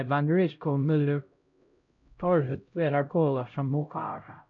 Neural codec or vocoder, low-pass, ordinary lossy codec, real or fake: codec, 16 kHz, 0.5 kbps, X-Codec, HuBERT features, trained on LibriSpeech; 7.2 kHz; AAC, 64 kbps; fake